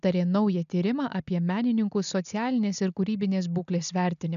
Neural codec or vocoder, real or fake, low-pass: none; real; 7.2 kHz